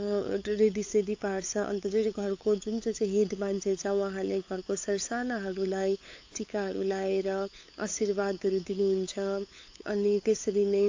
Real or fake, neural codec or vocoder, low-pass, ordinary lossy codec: fake; codec, 16 kHz, 8 kbps, FunCodec, trained on LibriTTS, 25 frames a second; 7.2 kHz; AAC, 48 kbps